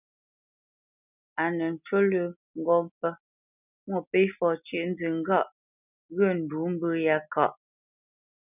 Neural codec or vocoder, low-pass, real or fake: none; 3.6 kHz; real